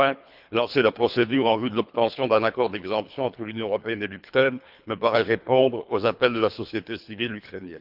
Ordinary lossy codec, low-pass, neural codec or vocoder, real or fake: none; 5.4 kHz; codec, 24 kHz, 3 kbps, HILCodec; fake